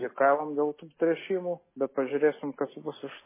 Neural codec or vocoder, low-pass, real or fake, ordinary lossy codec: none; 3.6 kHz; real; MP3, 16 kbps